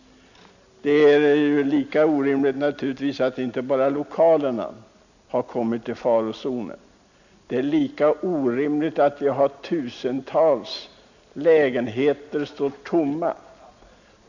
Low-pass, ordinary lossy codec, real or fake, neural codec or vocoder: 7.2 kHz; none; real; none